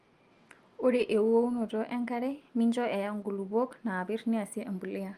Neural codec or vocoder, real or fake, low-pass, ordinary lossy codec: none; real; 14.4 kHz; Opus, 32 kbps